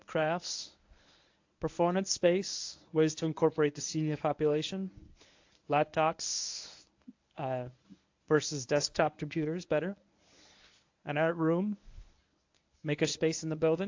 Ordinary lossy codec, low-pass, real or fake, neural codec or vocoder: AAC, 48 kbps; 7.2 kHz; fake; codec, 24 kHz, 0.9 kbps, WavTokenizer, medium speech release version 1